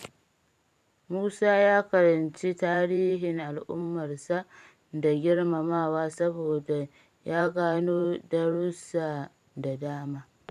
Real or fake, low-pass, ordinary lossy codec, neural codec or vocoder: fake; 14.4 kHz; none; vocoder, 44.1 kHz, 128 mel bands every 512 samples, BigVGAN v2